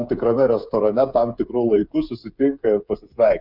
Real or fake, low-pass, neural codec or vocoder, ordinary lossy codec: fake; 5.4 kHz; codec, 16 kHz, 8 kbps, FreqCodec, smaller model; Opus, 64 kbps